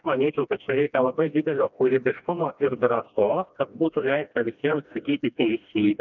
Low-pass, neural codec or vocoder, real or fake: 7.2 kHz; codec, 16 kHz, 1 kbps, FreqCodec, smaller model; fake